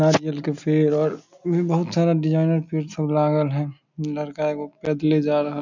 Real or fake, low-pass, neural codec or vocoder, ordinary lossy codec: real; 7.2 kHz; none; none